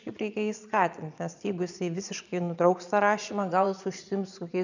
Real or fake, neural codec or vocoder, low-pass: fake; vocoder, 22.05 kHz, 80 mel bands, Vocos; 7.2 kHz